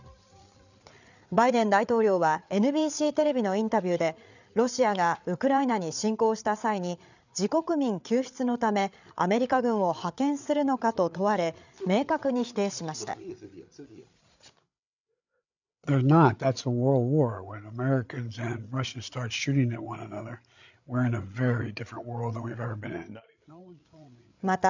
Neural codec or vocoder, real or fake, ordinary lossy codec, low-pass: codec, 16 kHz, 8 kbps, FreqCodec, larger model; fake; none; 7.2 kHz